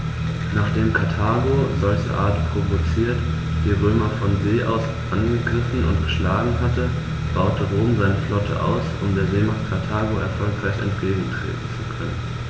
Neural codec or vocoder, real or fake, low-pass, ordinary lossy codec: none; real; none; none